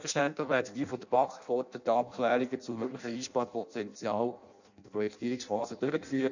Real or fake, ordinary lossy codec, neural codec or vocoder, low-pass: fake; none; codec, 16 kHz in and 24 kHz out, 0.6 kbps, FireRedTTS-2 codec; 7.2 kHz